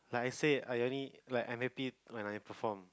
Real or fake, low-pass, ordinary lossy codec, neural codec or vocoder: real; none; none; none